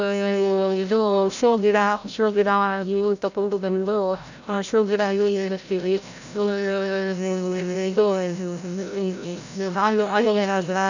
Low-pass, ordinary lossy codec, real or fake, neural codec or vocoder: 7.2 kHz; none; fake; codec, 16 kHz, 0.5 kbps, FreqCodec, larger model